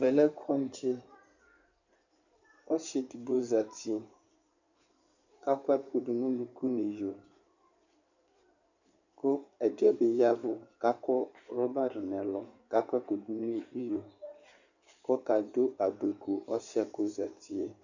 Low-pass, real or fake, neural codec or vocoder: 7.2 kHz; fake; codec, 16 kHz in and 24 kHz out, 2.2 kbps, FireRedTTS-2 codec